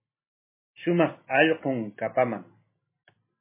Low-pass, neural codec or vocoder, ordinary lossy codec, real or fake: 3.6 kHz; none; MP3, 16 kbps; real